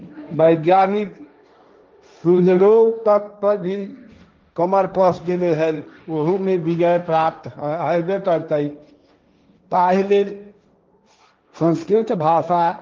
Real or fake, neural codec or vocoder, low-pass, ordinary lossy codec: fake; codec, 16 kHz, 1.1 kbps, Voila-Tokenizer; 7.2 kHz; Opus, 24 kbps